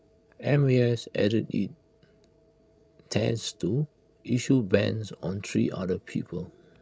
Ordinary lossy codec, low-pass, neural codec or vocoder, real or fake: none; none; codec, 16 kHz, 16 kbps, FreqCodec, larger model; fake